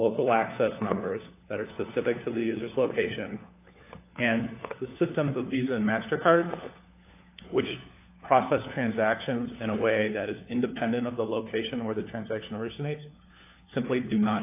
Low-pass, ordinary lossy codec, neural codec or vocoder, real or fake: 3.6 kHz; AAC, 24 kbps; codec, 16 kHz, 4 kbps, FunCodec, trained on LibriTTS, 50 frames a second; fake